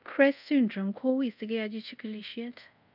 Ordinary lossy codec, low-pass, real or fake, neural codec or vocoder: none; 5.4 kHz; fake; codec, 24 kHz, 0.5 kbps, DualCodec